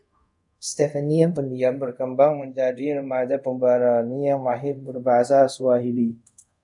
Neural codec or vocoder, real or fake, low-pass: codec, 24 kHz, 0.5 kbps, DualCodec; fake; 10.8 kHz